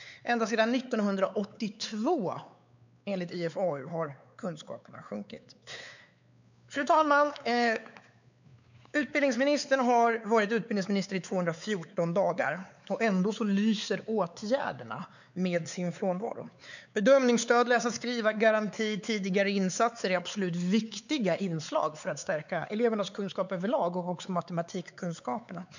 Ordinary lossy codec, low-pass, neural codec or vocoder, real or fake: none; 7.2 kHz; codec, 16 kHz, 4 kbps, X-Codec, WavLM features, trained on Multilingual LibriSpeech; fake